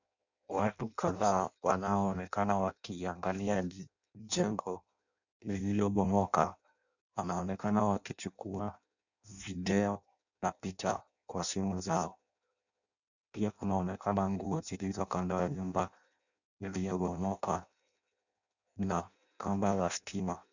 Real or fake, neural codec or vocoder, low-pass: fake; codec, 16 kHz in and 24 kHz out, 0.6 kbps, FireRedTTS-2 codec; 7.2 kHz